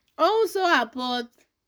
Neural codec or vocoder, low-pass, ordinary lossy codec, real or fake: codec, 44.1 kHz, 7.8 kbps, Pupu-Codec; none; none; fake